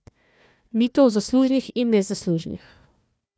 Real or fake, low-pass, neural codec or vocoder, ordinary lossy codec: fake; none; codec, 16 kHz, 1 kbps, FunCodec, trained on Chinese and English, 50 frames a second; none